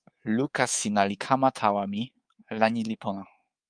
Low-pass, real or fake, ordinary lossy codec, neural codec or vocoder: 9.9 kHz; fake; Opus, 32 kbps; codec, 24 kHz, 3.1 kbps, DualCodec